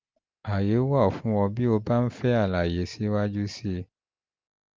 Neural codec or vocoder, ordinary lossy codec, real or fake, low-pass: none; Opus, 32 kbps; real; 7.2 kHz